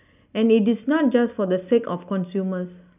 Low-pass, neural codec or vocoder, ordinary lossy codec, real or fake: 3.6 kHz; none; none; real